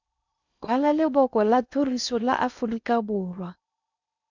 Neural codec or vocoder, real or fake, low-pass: codec, 16 kHz in and 24 kHz out, 0.6 kbps, FocalCodec, streaming, 2048 codes; fake; 7.2 kHz